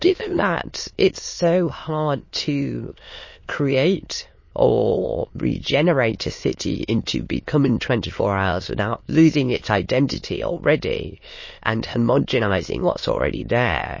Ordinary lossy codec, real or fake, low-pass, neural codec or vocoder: MP3, 32 kbps; fake; 7.2 kHz; autoencoder, 22.05 kHz, a latent of 192 numbers a frame, VITS, trained on many speakers